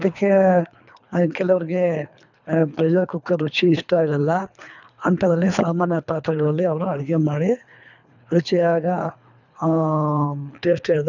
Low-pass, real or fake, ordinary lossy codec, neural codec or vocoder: 7.2 kHz; fake; none; codec, 24 kHz, 3 kbps, HILCodec